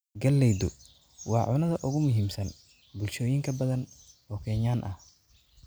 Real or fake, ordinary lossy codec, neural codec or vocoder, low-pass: real; none; none; none